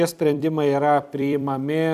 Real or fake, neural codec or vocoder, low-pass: fake; vocoder, 44.1 kHz, 128 mel bands every 256 samples, BigVGAN v2; 14.4 kHz